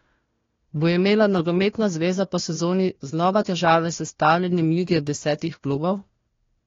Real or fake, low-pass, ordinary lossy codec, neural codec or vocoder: fake; 7.2 kHz; AAC, 32 kbps; codec, 16 kHz, 1 kbps, FunCodec, trained on Chinese and English, 50 frames a second